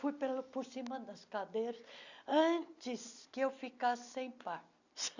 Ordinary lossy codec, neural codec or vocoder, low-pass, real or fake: none; none; 7.2 kHz; real